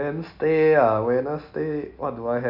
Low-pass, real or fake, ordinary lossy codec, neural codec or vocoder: 5.4 kHz; real; none; none